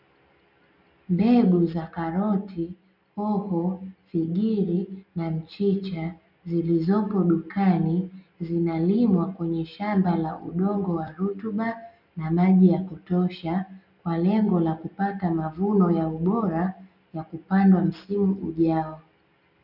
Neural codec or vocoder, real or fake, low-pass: none; real; 5.4 kHz